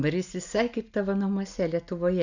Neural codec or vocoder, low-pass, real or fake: none; 7.2 kHz; real